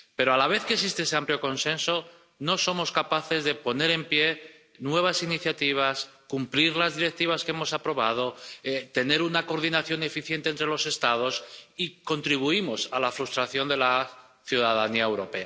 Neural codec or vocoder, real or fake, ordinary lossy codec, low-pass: none; real; none; none